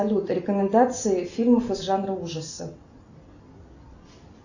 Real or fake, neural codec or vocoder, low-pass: real; none; 7.2 kHz